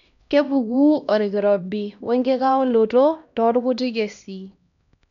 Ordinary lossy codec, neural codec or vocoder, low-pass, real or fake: MP3, 96 kbps; codec, 16 kHz, 1 kbps, X-Codec, HuBERT features, trained on LibriSpeech; 7.2 kHz; fake